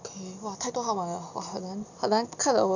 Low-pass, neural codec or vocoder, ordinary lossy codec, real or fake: 7.2 kHz; none; none; real